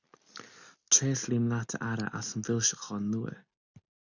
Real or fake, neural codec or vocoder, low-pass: real; none; 7.2 kHz